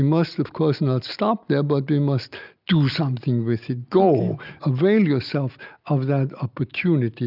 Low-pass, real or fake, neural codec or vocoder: 5.4 kHz; real; none